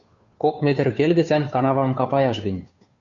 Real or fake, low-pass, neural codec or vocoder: fake; 7.2 kHz; codec, 16 kHz, 2 kbps, FunCodec, trained on Chinese and English, 25 frames a second